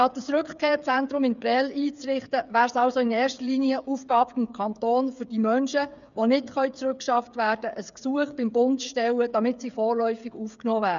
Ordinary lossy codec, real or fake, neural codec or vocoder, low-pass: none; fake; codec, 16 kHz, 8 kbps, FreqCodec, smaller model; 7.2 kHz